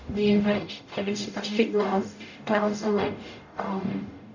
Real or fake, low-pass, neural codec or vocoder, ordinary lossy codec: fake; 7.2 kHz; codec, 44.1 kHz, 0.9 kbps, DAC; none